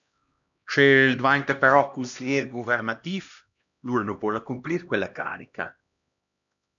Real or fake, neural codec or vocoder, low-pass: fake; codec, 16 kHz, 1 kbps, X-Codec, HuBERT features, trained on LibriSpeech; 7.2 kHz